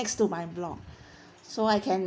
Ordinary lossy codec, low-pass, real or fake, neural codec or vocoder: none; none; real; none